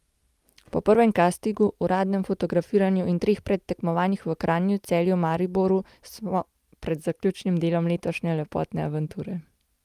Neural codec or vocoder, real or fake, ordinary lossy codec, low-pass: none; real; Opus, 32 kbps; 14.4 kHz